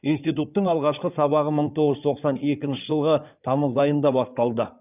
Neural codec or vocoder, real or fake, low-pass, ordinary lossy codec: codec, 16 kHz in and 24 kHz out, 2.2 kbps, FireRedTTS-2 codec; fake; 3.6 kHz; none